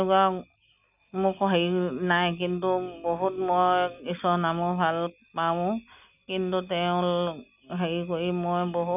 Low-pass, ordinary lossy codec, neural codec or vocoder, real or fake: 3.6 kHz; none; none; real